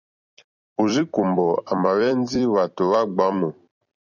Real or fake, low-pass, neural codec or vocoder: fake; 7.2 kHz; vocoder, 44.1 kHz, 128 mel bands every 256 samples, BigVGAN v2